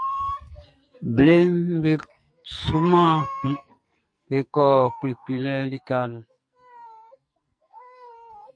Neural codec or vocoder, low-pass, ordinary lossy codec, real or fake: codec, 44.1 kHz, 2.6 kbps, SNAC; 9.9 kHz; MP3, 64 kbps; fake